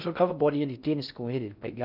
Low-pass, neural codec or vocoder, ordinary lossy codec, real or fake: 5.4 kHz; codec, 16 kHz in and 24 kHz out, 0.6 kbps, FocalCodec, streaming, 4096 codes; none; fake